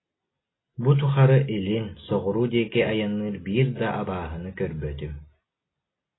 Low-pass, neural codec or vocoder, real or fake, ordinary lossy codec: 7.2 kHz; none; real; AAC, 16 kbps